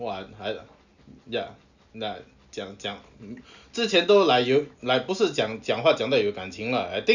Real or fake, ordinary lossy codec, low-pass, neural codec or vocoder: real; none; 7.2 kHz; none